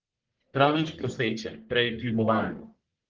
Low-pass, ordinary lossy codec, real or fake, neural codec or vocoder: 7.2 kHz; Opus, 16 kbps; fake; codec, 44.1 kHz, 1.7 kbps, Pupu-Codec